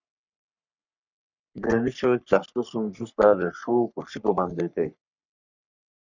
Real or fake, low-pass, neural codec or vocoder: fake; 7.2 kHz; codec, 44.1 kHz, 3.4 kbps, Pupu-Codec